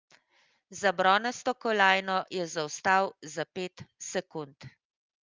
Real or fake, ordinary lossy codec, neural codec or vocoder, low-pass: real; Opus, 32 kbps; none; 7.2 kHz